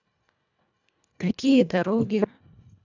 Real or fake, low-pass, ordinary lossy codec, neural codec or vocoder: fake; 7.2 kHz; none; codec, 24 kHz, 1.5 kbps, HILCodec